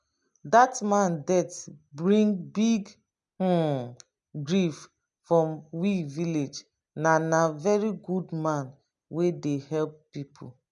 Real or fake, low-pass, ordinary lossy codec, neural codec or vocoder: real; 10.8 kHz; none; none